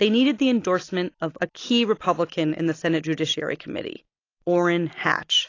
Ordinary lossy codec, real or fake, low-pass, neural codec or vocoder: AAC, 32 kbps; real; 7.2 kHz; none